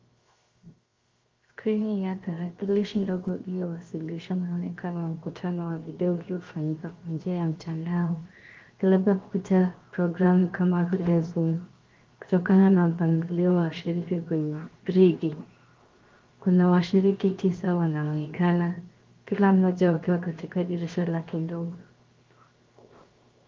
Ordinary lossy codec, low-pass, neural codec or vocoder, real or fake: Opus, 32 kbps; 7.2 kHz; codec, 16 kHz, 0.7 kbps, FocalCodec; fake